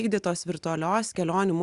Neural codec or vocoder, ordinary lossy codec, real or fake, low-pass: none; Opus, 64 kbps; real; 10.8 kHz